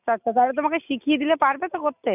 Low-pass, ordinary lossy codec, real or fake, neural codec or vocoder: 3.6 kHz; none; real; none